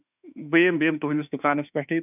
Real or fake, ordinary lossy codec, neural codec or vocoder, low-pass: fake; none; autoencoder, 48 kHz, 32 numbers a frame, DAC-VAE, trained on Japanese speech; 3.6 kHz